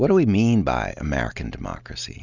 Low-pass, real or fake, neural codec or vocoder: 7.2 kHz; real; none